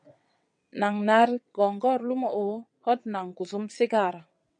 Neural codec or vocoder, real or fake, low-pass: vocoder, 22.05 kHz, 80 mel bands, WaveNeXt; fake; 9.9 kHz